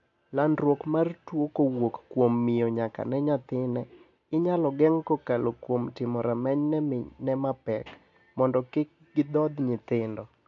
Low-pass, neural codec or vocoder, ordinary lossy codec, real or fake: 7.2 kHz; none; none; real